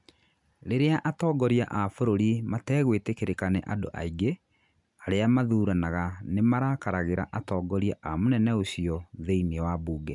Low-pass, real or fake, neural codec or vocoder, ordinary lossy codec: 10.8 kHz; real; none; none